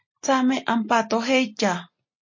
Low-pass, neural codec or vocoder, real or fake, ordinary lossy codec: 7.2 kHz; none; real; MP3, 32 kbps